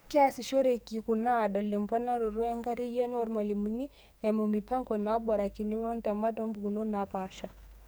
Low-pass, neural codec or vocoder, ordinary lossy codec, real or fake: none; codec, 44.1 kHz, 2.6 kbps, SNAC; none; fake